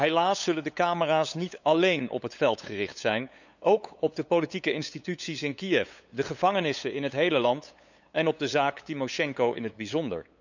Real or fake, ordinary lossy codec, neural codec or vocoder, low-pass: fake; none; codec, 16 kHz, 8 kbps, FunCodec, trained on LibriTTS, 25 frames a second; 7.2 kHz